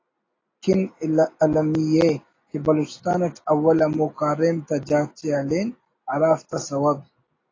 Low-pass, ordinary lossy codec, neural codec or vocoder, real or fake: 7.2 kHz; AAC, 32 kbps; none; real